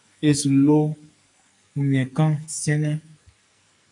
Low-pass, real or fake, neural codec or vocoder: 10.8 kHz; fake; codec, 44.1 kHz, 2.6 kbps, SNAC